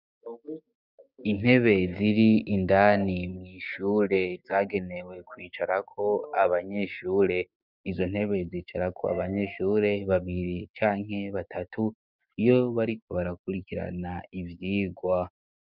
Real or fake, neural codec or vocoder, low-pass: fake; codec, 16 kHz, 6 kbps, DAC; 5.4 kHz